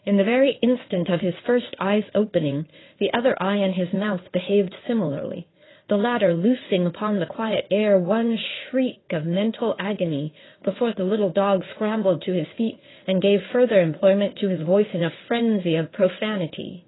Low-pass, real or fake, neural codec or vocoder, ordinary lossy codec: 7.2 kHz; fake; codec, 16 kHz in and 24 kHz out, 2.2 kbps, FireRedTTS-2 codec; AAC, 16 kbps